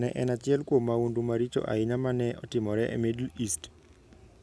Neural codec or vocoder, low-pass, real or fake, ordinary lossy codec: none; none; real; none